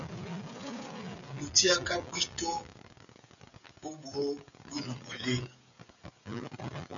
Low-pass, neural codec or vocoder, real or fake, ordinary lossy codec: 7.2 kHz; codec, 16 kHz, 16 kbps, FreqCodec, smaller model; fake; MP3, 96 kbps